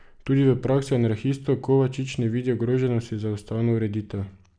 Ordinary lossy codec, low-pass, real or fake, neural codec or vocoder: none; 9.9 kHz; real; none